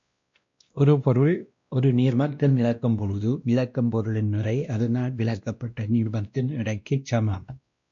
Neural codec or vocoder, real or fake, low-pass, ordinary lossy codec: codec, 16 kHz, 1 kbps, X-Codec, WavLM features, trained on Multilingual LibriSpeech; fake; 7.2 kHz; MP3, 64 kbps